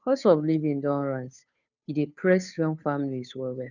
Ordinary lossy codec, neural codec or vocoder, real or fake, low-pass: none; codec, 16 kHz, 2 kbps, FunCodec, trained on Chinese and English, 25 frames a second; fake; 7.2 kHz